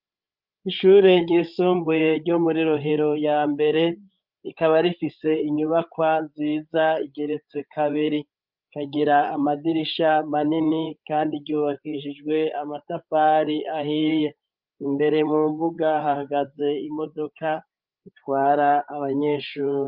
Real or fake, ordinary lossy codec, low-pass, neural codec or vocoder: fake; Opus, 24 kbps; 5.4 kHz; codec, 16 kHz, 8 kbps, FreqCodec, larger model